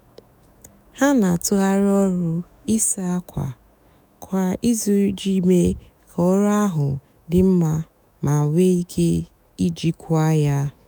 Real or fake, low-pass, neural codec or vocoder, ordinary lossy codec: fake; none; autoencoder, 48 kHz, 128 numbers a frame, DAC-VAE, trained on Japanese speech; none